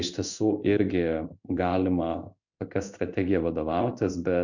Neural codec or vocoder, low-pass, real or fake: codec, 16 kHz in and 24 kHz out, 1 kbps, XY-Tokenizer; 7.2 kHz; fake